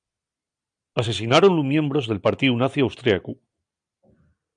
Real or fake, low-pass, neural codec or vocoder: real; 9.9 kHz; none